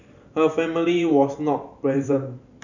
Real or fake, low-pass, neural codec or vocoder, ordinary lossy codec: fake; 7.2 kHz; vocoder, 44.1 kHz, 128 mel bands every 512 samples, BigVGAN v2; none